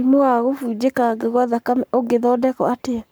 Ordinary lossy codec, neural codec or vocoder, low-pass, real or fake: none; codec, 44.1 kHz, 7.8 kbps, Pupu-Codec; none; fake